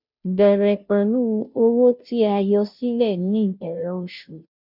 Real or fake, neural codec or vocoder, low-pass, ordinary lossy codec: fake; codec, 16 kHz, 0.5 kbps, FunCodec, trained on Chinese and English, 25 frames a second; 5.4 kHz; none